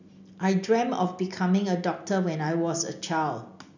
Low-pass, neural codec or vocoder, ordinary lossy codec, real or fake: 7.2 kHz; none; none; real